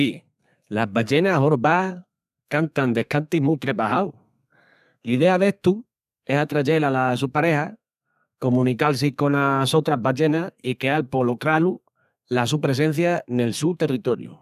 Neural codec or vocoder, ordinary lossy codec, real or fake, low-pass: codec, 32 kHz, 1.9 kbps, SNAC; none; fake; 14.4 kHz